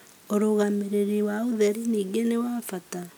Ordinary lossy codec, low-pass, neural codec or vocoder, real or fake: none; none; none; real